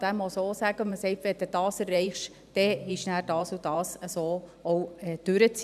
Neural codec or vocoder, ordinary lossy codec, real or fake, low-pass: vocoder, 44.1 kHz, 128 mel bands every 512 samples, BigVGAN v2; none; fake; 14.4 kHz